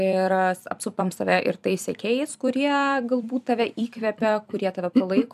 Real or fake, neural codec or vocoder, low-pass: fake; vocoder, 44.1 kHz, 128 mel bands every 256 samples, BigVGAN v2; 14.4 kHz